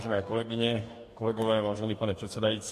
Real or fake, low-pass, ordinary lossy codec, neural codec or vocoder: fake; 14.4 kHz; MP3, 64 kbps; codec, 44.1 kHz, 2.6 kbps, DAC